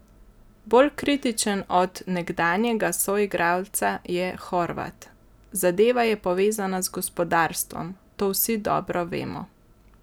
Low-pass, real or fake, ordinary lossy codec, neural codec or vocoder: none; real; none; none